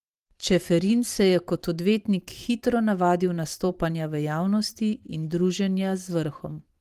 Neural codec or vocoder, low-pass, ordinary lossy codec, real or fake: vocoder, 44.1 kHz, 128 mel bands every 512 samples, BigVGAN v2; 14.4 kHz; Opus, 24 kbps; fake